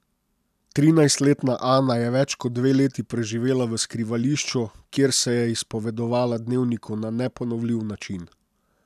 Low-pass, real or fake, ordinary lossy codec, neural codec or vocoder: 14.4 kHz; real; none; none